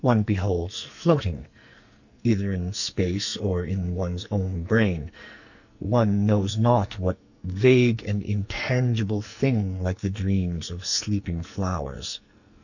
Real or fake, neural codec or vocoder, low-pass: fake; codec, 44.1 kHz, 2.6 kbps, SNAC; 7.2 kHz